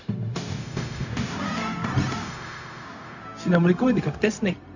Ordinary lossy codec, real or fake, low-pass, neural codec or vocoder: none; fake; 7.2 kHz; codec, 16 kHz, 0.4 kbps, LongCat-Audio-Codec